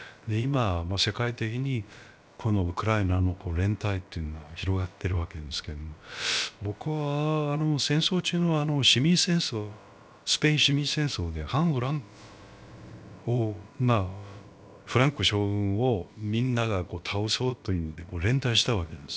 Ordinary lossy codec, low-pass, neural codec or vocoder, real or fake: none; none; codec, 16 kHz, about 1 kbps, DyCAST, with the encoder's durations; fake